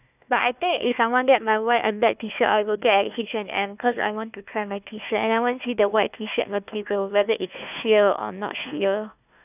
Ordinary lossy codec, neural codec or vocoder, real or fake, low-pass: none; codec, 16 kHz, 1 kbps, FunCodec, trained on Chinese and English, 50 frames a second; fake; 3.6 kHz